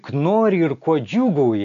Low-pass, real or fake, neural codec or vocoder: 7.2 kHz; real; none